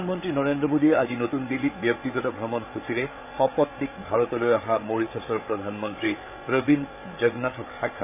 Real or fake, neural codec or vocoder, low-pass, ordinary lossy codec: fake; codec, 16 kHz, 8 kbps, FreqCodec, larger model; 3.6 kHz; none